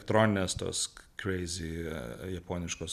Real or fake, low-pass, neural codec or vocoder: real; 14.4 kHz; none